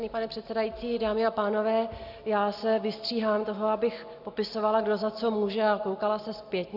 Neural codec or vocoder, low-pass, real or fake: none; 5.4 kHz; real